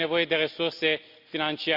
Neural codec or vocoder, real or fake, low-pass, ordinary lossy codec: none; real; 5.4 kHz; AAC, 48 kbps